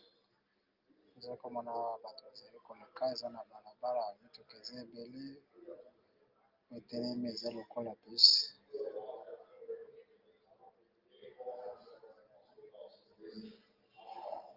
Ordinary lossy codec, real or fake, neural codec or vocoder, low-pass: Opus, 24 kbps; real; none; 5.4 kHz